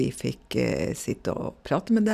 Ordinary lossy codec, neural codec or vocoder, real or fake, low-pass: none; none; real; 14.4 kHz